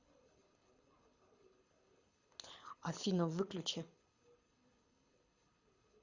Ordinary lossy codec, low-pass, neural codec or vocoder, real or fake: Opus, 64 kbps; 7.2 kHz; codec, 24 kHz, 6 kbps, HILCodec; fake